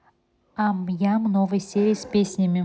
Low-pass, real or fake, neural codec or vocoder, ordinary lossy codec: none; real; none; none